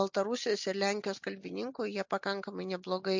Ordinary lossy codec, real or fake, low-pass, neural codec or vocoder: MP3, 64 kbps; real; 7.2 kHz; none